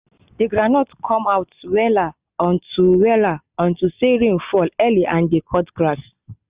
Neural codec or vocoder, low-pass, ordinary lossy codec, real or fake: none; 3.6 kHz; Opus, 64 kbps; real